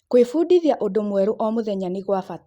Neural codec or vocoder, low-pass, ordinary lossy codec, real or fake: none; 19.8 kHz; Opus, 64 kbps; real